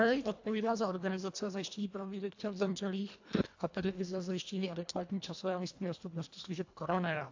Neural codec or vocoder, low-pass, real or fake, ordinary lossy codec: codec, 24 kHz, 1.5 kbps, HILCodec; 7.2 kHz; fake; AAC, 48 kbps